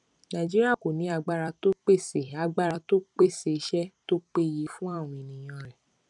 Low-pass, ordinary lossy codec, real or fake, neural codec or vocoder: 10.8 kHz; none; fake; vocoder, 44.1 kHz, 128 mel bands every 256 samples, BigVGAN v2